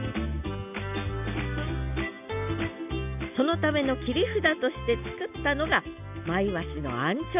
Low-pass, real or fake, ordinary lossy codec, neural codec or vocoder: 3.6 kHz; real; none; none